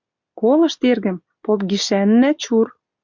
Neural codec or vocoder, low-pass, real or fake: none; 7.2 kHz; real